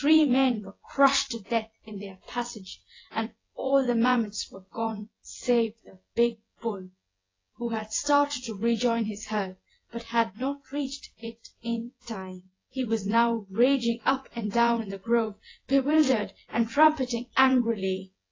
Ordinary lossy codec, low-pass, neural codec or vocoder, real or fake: AAC, 32 kbps; 7.2 kHz; vocoder, 24 kHz, 100 mel bands, Vocos; fake